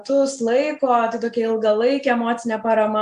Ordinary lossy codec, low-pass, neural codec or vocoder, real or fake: Opus, 64 kbps; 10.8 kHz; none; real